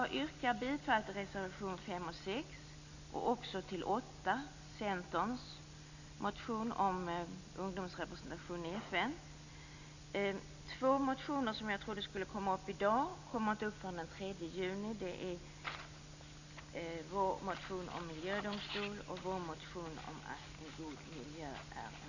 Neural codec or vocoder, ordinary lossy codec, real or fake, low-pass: none; none; real; 7.2 kHz